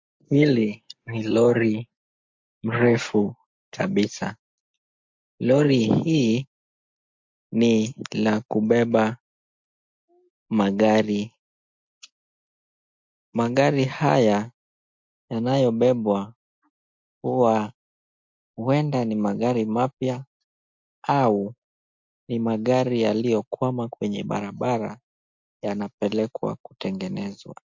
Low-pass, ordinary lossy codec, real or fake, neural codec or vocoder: 7.2 kHz; MP3, 48 kbps; real; none